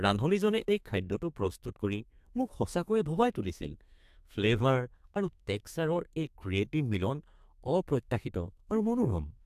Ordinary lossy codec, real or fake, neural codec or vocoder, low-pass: AAC, 96 kbps; fake; codec, 32 kHz, 1.9 kbps, SNAC; 14.4 kHz